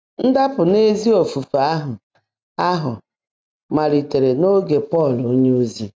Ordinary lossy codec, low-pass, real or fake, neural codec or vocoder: none; none; real; none